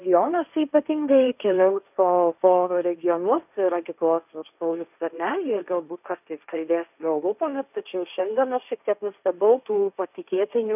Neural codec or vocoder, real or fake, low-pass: codec, 16 kHz, 1.1 kbps, Voila-Tokenizer; fake; 3.6 kHz